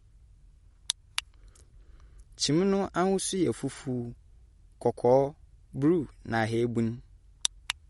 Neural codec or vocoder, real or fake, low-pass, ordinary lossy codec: none; real; 19.8 kHz; MP3, 48 kbps